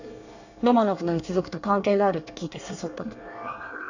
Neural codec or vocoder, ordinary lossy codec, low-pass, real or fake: codec, 24 kHz, 1 kbps, SNAC; none; 7.2 kHz; fake